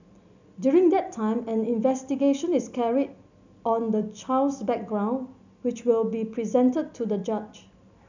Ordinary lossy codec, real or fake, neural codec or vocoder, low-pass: none; real; none; 7.2 kHz